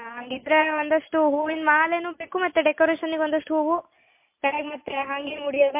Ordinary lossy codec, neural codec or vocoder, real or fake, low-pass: MP3, 24 kbps; none; real; 3.6 kHz